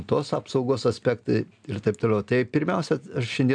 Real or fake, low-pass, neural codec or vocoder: real; 9.9 kHz; none